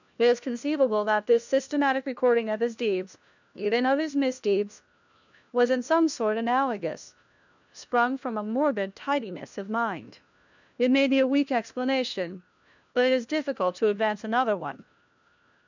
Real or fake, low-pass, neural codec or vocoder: fake; 7.2 kHz; codec, 16 kHz, 1 kbps, FunCodec, trained on LibriTTS, 50 frames a second